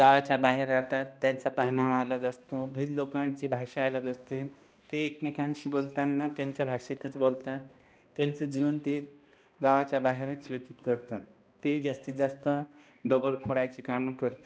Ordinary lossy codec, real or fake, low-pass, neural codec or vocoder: none; fake; none; codec, 16 kHz, 1 kbps, X-Codec, HuBERT features, trained on balanced general audio